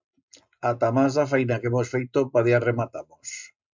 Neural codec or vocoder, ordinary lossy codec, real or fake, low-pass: none; MP3, 64 kbps; real; 7.2 kHz